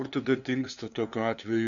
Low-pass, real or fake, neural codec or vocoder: 7.2 kHz; fake; codec, 16 kHz, 2 kbps, FunCodec, trained on LibriTTS, 25 frames a second